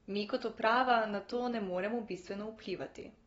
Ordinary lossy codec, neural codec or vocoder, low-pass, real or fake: AAC, 24 kbps; none; 19.8 kHz; real